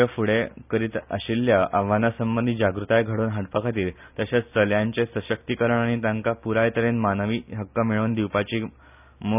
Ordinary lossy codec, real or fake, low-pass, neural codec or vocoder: none; real; 3.6 kHz; none